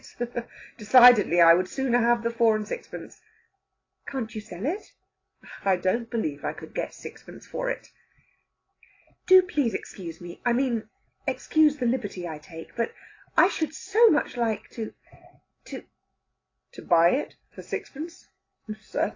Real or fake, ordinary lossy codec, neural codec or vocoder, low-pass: real; AAC, 32 kbps; none; 7.2 kHz